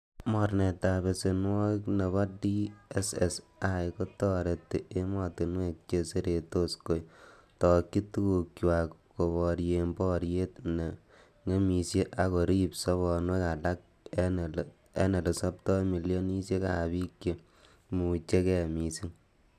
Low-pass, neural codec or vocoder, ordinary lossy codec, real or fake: 14.4 kHz; none; none; real